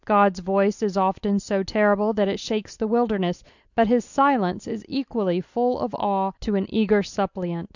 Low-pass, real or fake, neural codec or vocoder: 7.2 kHz; real; none